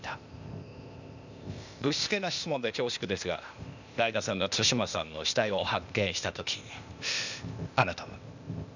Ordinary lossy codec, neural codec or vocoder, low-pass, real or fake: none; codec, 16 kHz, 0.8 kbps, ZipCodec; 7.2 kHz; fake